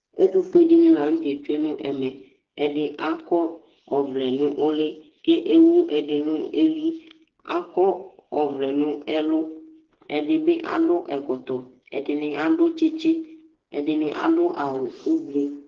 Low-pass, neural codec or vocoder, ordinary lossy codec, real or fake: 7.2 kHz; codec, 16 kHz, 4 kbps, FreqCodec, smaller model; Opus, 16 kbps; fake